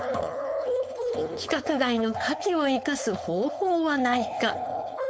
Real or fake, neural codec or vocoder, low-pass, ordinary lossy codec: fake; codec, 16 kHz, 4.8 kbps, FACodec; none; none